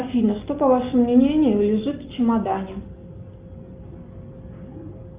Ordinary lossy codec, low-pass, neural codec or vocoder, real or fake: Opus, 32 kbps; 3.6 kHz; none; real